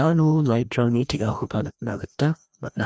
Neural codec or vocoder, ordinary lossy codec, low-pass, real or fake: codec, 16 kHz, 1 kbps, FreqCodec, larger model; none; none; fake